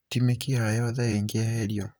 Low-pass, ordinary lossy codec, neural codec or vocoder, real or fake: none; none; vocoder, 44.1 kHz, 128 mel bands, Pupu-Vocoder; fake